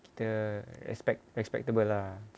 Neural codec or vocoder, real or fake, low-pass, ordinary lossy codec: none; real; none; none